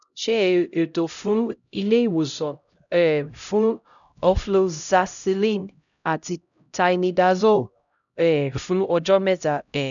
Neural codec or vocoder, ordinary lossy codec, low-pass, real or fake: codec, 16 kHz, 0.5 kbps, X-Codec, HuBERT features, trained on LibriSpeech; none; 7.2 kHz; fake